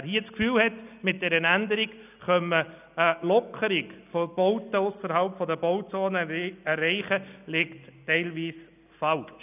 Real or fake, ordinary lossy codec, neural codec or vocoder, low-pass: real; none; none; 3.6 kHz